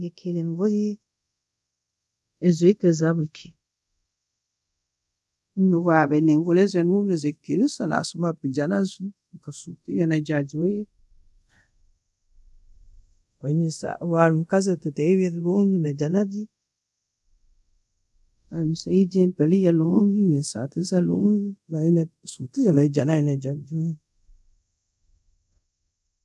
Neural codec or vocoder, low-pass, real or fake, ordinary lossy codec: codec, 24 kHz, 0.5 kbps, DualCodec; none; fake; none